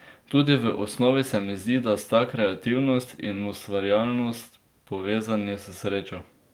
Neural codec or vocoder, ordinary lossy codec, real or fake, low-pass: codec, 44.1 kHz, 7.8 kbps, DAC; Opus, 32 kbps; fake; 19.8 kHz